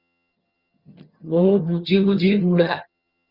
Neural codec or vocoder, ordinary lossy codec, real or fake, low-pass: vocoder, 22.05 kHz, 80 mel bands, HiFi-GAN; Opus, 64 kbps; fake; 5.4 kHz